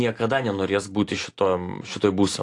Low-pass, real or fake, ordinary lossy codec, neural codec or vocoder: 10.8 kHz; real; AAC, 48 kbps; none